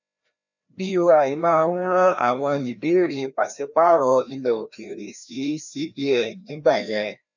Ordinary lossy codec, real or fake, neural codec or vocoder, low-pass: none; fake; codec, 16 kHz, 1 kbps, FreqCodec, larger model; 7.2 kHz